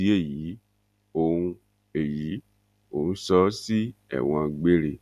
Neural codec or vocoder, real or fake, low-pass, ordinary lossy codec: none; real; 14.4 kHz; none